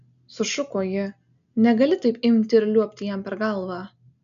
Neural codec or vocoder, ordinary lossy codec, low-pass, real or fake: none; AAC, 96 kbps; 7.2 kHz; real